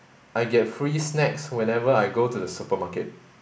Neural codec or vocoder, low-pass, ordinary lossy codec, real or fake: none; none; none; real